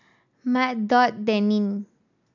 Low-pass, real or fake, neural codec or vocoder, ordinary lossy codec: 7.2 kHz; real; none; none